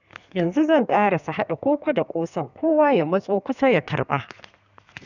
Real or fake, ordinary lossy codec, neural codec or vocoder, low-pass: fake; none; codec, 32 kHz, 1.9 kbps, SNAC; 7.2 kHz